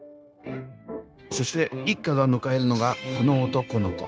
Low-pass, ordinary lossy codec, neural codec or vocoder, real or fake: none; none; codec, 16 kHz, 0.9 kbps, LongCat-Audio-Codec; fake